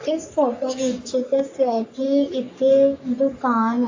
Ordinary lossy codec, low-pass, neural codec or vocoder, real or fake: AAC, 48 kbps; 7.2 kHz; codec, 44.1 kHz, 3.4 kbps, Pupu-Codec; fake